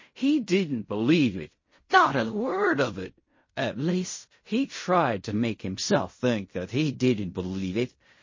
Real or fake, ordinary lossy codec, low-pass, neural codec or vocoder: fake; MP3, 32 kbps; 7.2 kHz; codec, 16 kHz in and 24 kHz out, 0.4 kbps, LongCat-Audio-Codec, fine tuned four codebook decoder